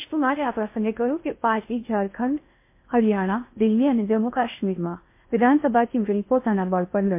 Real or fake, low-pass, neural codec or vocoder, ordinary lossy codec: fake; 3.6 kHz; codec, 16 kHz in and 24 kHz out, 0.6 kbps, FocalCodec, streaming, 2048 codes; MP3, 24 kbps